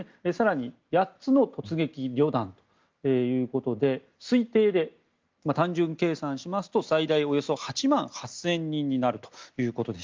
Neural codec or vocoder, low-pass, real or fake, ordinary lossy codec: none; 7.2 kHz; real; Opus, 32 kbps